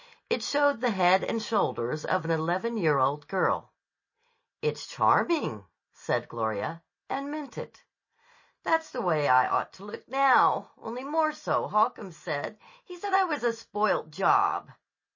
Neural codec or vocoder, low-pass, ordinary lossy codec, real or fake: none; 7.2 kHz; MP3, 32 kbps; real